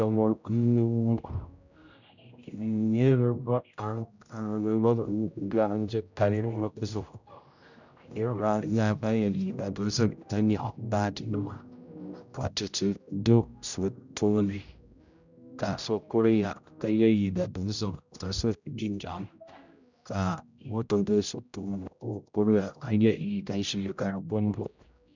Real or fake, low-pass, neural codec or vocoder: fake; 7.2 kHz; codec, 16 kHz, 0.5 kbps, X-Codec, HuBERT features, trained on general audio